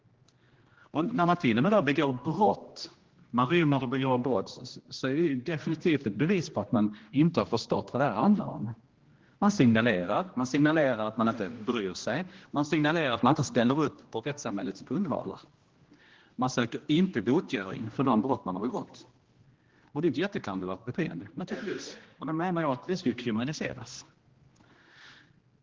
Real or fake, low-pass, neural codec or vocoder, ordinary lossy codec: fake; 7.2 kHz; codec, 16 kHz, 1 kbps, X-Codec, HuBERT features, trained on general audio; Opus, 16 kbps